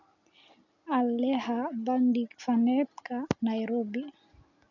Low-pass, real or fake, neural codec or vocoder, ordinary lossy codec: 7.2 kHz; real; none; none